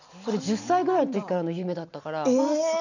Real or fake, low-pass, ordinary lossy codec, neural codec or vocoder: real; 7.2 kHz; none; none